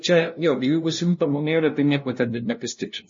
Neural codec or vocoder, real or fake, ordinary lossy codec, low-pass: codec, 16 kHz, 0.5 kbps, FunCodec, trained on LibriTTS, 25 frames a second; fake; MP3, 32 kbps; 7.2 kHz